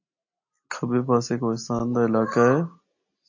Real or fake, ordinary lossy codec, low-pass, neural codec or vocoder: real; MP3, 32 kbps; 7.2 kHz; none